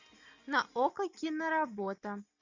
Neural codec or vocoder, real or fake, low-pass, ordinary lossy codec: none; real; 7.2 kHz; MP3, 64 kbps